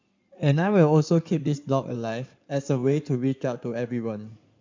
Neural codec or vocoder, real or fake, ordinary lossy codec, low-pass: codec, 16 kHz in and 24 kHz out, 2.2 kbps, FireRedTTS-2 codec; fake; MP3, 64 kbps; 7.2 kHz